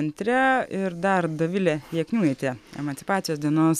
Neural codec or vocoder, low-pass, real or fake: none; 14.4 kHz; real